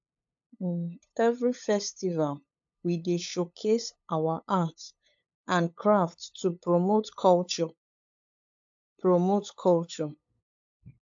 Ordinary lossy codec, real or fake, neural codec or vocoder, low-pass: AAC, 64 kbps; fake; codec, 16 kHz, 8 kbps, FunCodec, trained on LibriTTS, 25 frames a second; 7.2 kHz